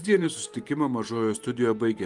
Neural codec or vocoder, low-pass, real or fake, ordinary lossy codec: vocoder, 44.1 kHz, 128 mel bands every 512 samples, BigVGAN v2; 10.8 kHz; fake; Opus, 32 kbps